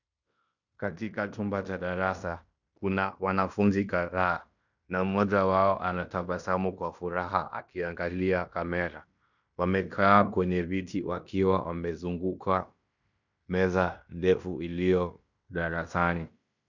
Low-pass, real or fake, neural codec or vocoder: 7.2 kHz; fake; codec, 16 kHz in and 24 kHz out, 0.9 kbps, LongCat-Audio-Codec, fine tuned four codebook decoder